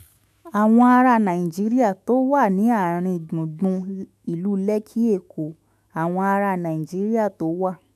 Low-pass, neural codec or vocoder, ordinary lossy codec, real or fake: 14.4 kHz; autoencoder, 48 kHz, 128 numbers a frame, DAC-VAE, trained on Japanese speech; MP3, 96 kbps; fake